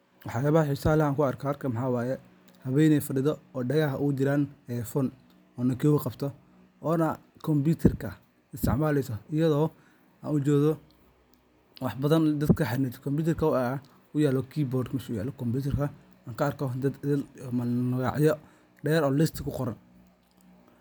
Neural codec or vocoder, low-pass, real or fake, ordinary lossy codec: none; none; real; none